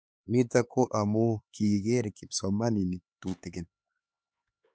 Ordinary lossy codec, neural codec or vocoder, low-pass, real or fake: none; codec, 16 kHz, 4 kbps, X-Codec, HuBERT features, trained on LibriSpeech; none; fake